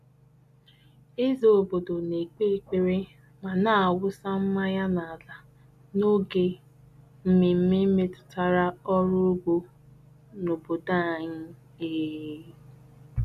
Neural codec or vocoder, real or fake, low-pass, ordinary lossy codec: none; real; 14.4 kHz; none